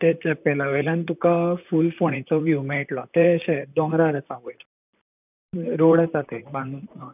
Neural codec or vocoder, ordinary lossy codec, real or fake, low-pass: vocoder, 44.1 kHz, 128 mel bands, Pupu-Vocoder; none; fake; 3.6 kHz